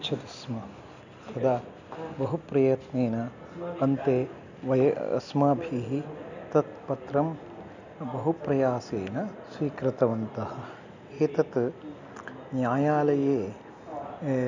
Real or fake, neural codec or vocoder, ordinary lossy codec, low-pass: real; none; none; 7.2 kHz